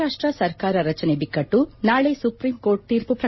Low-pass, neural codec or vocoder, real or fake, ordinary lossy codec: 7.2 kHz; none; real; MP3, 24 kbps